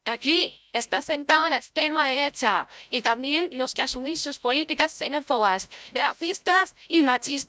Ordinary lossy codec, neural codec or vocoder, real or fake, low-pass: none; codec, 16 kHz, 0.5 kbps, FreqCodec, larger model; fake; none